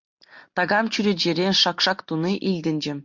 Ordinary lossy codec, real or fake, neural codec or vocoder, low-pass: MP3, 48 kbps; real; none; 7.2 kHz